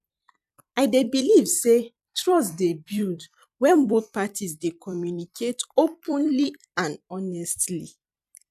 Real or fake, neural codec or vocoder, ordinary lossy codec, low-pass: fake; vocoder, 44.1 kHz, 128 mel bands every 256 samples, BigVGAN v2; none; 14.4 kHz